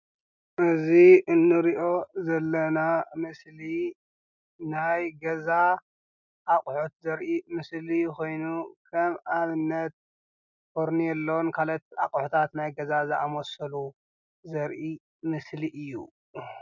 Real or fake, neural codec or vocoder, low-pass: real; none; 7.2 kHz